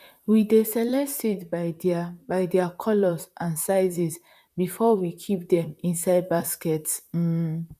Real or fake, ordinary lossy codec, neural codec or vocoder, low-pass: fake; none; vocoder, 44.1 kHz, 128 mel bands, Pupu-Vocoder; 14.4 kHz